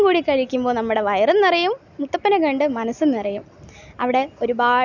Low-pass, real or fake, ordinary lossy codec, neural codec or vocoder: 7.2 kHz; real; none; none